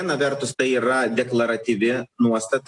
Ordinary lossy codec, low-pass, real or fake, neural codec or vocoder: AAC, 64 kbps; 10.8 kHz; real; none